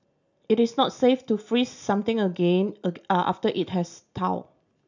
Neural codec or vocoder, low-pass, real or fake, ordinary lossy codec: none; 7.2 kHz; real; none